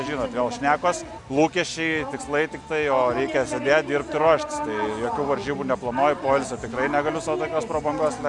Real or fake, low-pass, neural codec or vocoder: real; 10.8 kHz; none